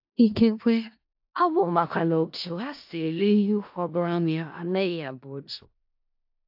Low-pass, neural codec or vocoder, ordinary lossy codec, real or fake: 5.4 kHz; codec, 16 kHz in and 24 kHz out, 0.4 kbps, LongCat-Audio-Codec, four codebook decoder; AAC, 48 kbps; fake